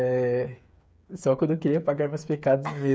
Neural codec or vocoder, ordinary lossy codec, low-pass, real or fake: codec, 16 kHz, 8 kbps, FreqCodec, smaller model; none; none; fake